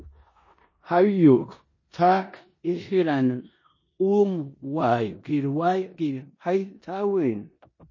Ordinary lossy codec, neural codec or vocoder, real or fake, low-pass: MP3, 32 kbps; codec, 16 kHz in and 24 kHz out, 0.9 kbps, LongCat-Audio-Codec, four codebook decoder; fake; 7.2 kHz